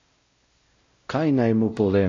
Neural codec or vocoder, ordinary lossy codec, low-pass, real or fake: codec, 16 kHz, 0.5 kbps, X-Codec, WavLM features, trained on Multilingual LibriSpeech; AAC, 32 kbps; 7.2 kHz; fake